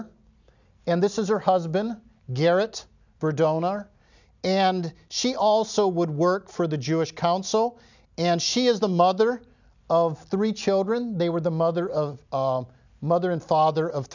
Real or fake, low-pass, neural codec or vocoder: real; 7.2 kHz; none